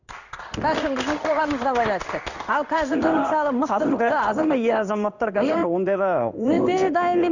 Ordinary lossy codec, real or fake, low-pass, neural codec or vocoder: none; fake; 7.2 kHz; codec, 16 kHz in and 24 kHz out, 1 kbps, XY-Tokenizer